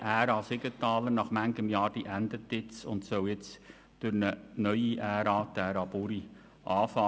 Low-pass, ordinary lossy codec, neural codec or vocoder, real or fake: none; none; none; real